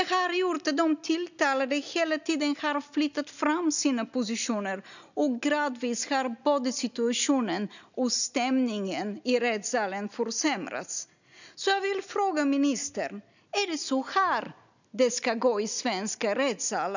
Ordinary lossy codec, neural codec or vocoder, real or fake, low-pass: none; none; real; 7.2 kHz